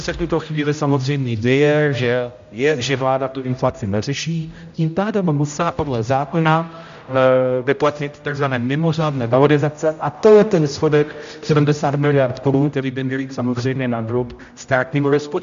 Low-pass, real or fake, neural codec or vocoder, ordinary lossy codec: 7.2 kHz; fake; codec, 16 kHz, 0.5 kbps, X-Codec, HuBERT features, trained on general audio; MP3, 64 kbps